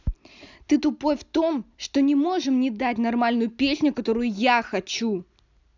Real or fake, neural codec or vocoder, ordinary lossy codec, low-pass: real; none; none; 7.2 kHz